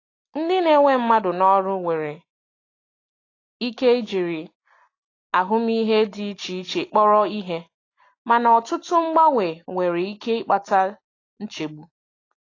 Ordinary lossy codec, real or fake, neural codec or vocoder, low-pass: AAC, 32 kbps; real; none; 7.2 kHz